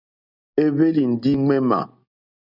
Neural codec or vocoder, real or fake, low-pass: none; real; 5.4 kHz